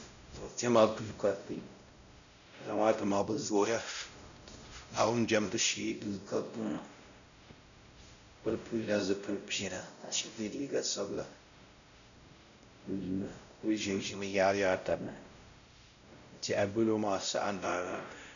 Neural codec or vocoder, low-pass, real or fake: codec, 16 kHz, 0.5 kbps, X-Codec, WavLM features, trained on Multilingual LibriSpeech; 7.2 kHz; fake